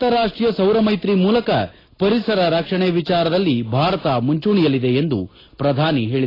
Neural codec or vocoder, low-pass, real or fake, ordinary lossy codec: none; 5.4 kHz; real; AAC, 24 kbps